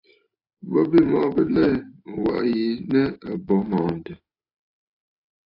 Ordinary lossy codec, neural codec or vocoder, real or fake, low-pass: AAC, 32 kbps; none; real; 5.4 kHz